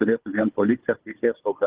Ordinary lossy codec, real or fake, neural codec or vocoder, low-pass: Opus, 16 kbps; fake; codec, 16 kHz, 16 kbps, FunCodec, trained on Chinese and English, 50 frames a second; 3.6 kHz